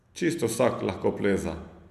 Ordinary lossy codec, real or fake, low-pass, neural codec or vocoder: none; real; 14.4 kHz; none